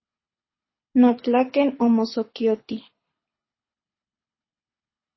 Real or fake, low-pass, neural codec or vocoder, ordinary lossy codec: fake; 7.2 kHz; codec, 24 kHz, 6 kbps, HILCodec; MP3, 24 kbps